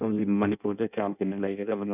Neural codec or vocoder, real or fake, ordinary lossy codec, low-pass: codec, 16 kHz in and 24 kHz out, 0.6 kbps, FireRedTTS-2 codec; fake; none; 3.6 kHz